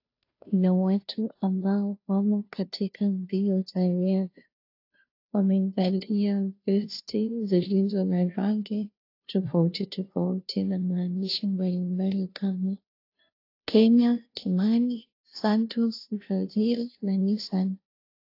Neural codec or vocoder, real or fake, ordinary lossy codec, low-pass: codec, 16 kHz, 0.5 kbps, FunCodec, trained on Chinese and English, 25 frames a second; fake; AAC, 32 kbps; 5.4 kHz